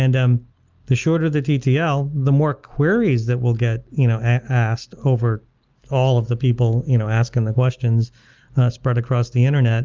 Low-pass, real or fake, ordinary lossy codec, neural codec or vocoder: 7.2 kHz; real; Opus, 32 kbps; none